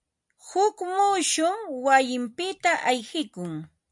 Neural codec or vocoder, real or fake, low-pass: none; real; 10.8 kHz